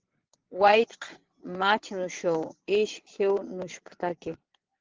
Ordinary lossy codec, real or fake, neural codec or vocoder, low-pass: Opus, 16 kbps; fake; vocoder, 22.05 kHz, 80 mel bands, Vocos; 7.2 kHz